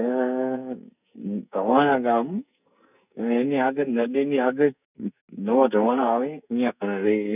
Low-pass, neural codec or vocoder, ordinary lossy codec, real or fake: 3.6 kHz; codec, 44.1 kHz, 2.6 kbps, SNAC; none; fake